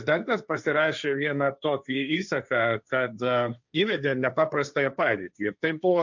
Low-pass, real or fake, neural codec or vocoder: 7.2 kHz; fake; codec, 16 kHz, 1.1 kbps, Voila-Tokenizer